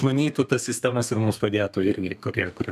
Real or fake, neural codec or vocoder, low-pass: fake; codec, 32 kHz, 1.9 kbps, SNAC; 14.4 kHz